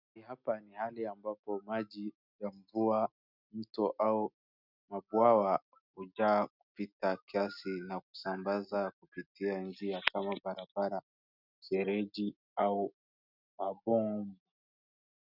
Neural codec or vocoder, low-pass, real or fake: none; 5.4 kHz; real